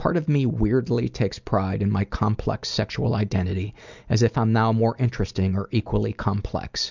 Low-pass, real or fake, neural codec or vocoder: 7.2 kHz; real; none